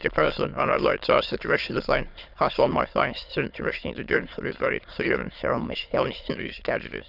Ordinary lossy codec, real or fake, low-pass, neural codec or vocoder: none; fake; 5.4 kHz; autoencoder, 22.05 kHz, a latent of 192 numbers a frame, VITS, trained on many speakers